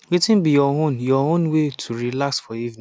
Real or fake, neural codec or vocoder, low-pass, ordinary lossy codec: real; none; none; none